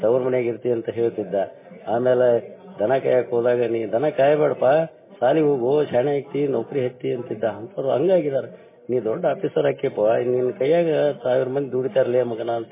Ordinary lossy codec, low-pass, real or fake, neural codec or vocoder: MP3, 16 kbps; 3.6 kHz; real; none